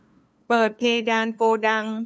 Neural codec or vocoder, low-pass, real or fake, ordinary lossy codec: codec, 16 kHz, 2 kbps, FunCodec, trained on LibriTTS, 25 frames a second; none; fake; none